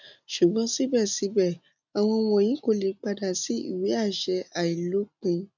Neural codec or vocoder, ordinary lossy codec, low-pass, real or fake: none; none; 7.2 kHz; real